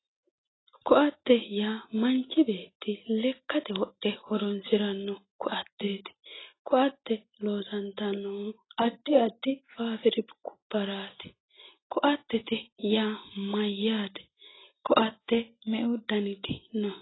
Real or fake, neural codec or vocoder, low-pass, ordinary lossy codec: real; none; 7.2 kHz; AAC, 16 kbps